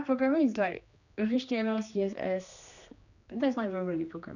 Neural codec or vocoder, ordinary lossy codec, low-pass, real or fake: codec, 16 kHz, 2 kbps, X-Codec, HuBERT features, trained on general audio; none; 7.2 kHz; fake